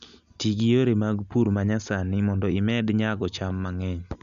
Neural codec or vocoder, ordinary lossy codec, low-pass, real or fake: none; none; 7.2 kHz; real